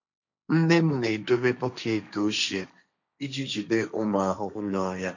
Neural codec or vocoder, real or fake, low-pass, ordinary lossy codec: codec, 16 kHz, 1.1 kbps, Voila-Tokenizer; fake; 7.2 kHz; none